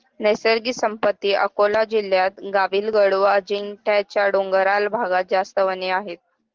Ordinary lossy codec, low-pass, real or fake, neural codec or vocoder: Opus, 16 kbps; 7.2 kHz; real; none